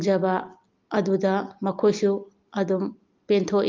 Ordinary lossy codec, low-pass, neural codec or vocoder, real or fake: Opus, 32 kbps; 7.2 kHz; none; real